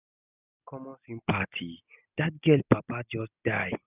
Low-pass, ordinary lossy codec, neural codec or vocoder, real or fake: 3.6 kHz; none; none; real